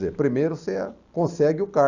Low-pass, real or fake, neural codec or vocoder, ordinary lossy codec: 7.2 kHz; real; none; Opus, 64 kbps